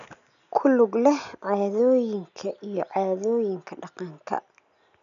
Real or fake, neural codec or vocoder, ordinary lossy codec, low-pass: real; none; none; 7.2 kHz